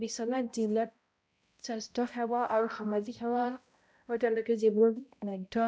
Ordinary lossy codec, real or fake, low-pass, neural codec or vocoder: none; fake; none; codec, 16 kHz, 0.5 kbps, X-Codec, HuBERT features, trained on balanced general audio